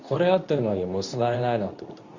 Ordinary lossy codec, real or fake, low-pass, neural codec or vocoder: Opus, 64 kbps; fake; 7.2 kHz; codec, 24 kHz, 0.9 kbps, WavTokenizer, medium speech release version 2